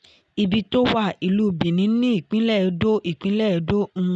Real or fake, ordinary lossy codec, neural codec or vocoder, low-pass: real; none; none; none